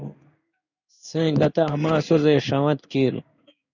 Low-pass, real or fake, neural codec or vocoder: 7.2 kHz; fake; codec, 16 kHz in and 24 kHz out, 1 kbps, XY-Tokenizer